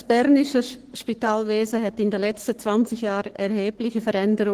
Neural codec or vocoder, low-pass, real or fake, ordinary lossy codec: codec, 44.1 kHz, 3.4 kbps, Pupu-Codec; 14.4 kHz; fake; Opus, 16 kbps